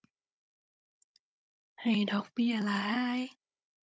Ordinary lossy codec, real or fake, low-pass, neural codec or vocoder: none; fake; none; codec, 16 kHz, 16 kbps, FunCodec, trained on Chinese and English, 50 frames a second